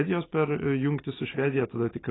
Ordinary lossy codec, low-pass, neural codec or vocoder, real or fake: AAC, 16 kbps; 7.2 kHz; none; real